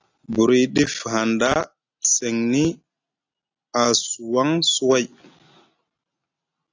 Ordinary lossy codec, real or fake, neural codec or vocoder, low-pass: AAC, 48 kbps; real; none; 7.2 kHz